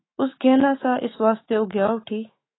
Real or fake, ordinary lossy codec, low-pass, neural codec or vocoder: fake; AAC, 16 kbps; 7.2 kHz; autoencoder, 48 kHz, 128 numbers a frame, DAC-VAE, trained on Japanese speech